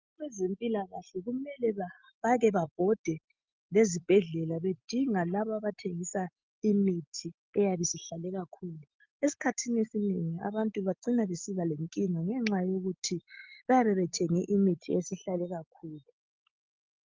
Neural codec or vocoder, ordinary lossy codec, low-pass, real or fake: none; Opus, 32 kbps; 7.2 kHz; real